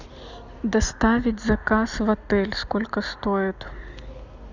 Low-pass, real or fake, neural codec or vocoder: 7.2 kHz; real; none